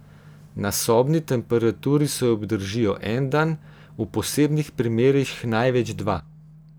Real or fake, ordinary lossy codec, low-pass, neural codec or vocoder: real; none; none; none